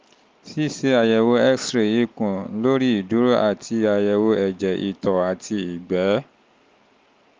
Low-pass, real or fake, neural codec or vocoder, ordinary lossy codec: 7.2 kHz; real; none; Opus, 24 kbps